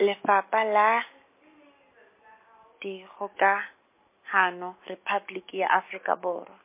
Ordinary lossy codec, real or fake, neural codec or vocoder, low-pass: MP3, 24 kbps; real; none; 3.6 kHz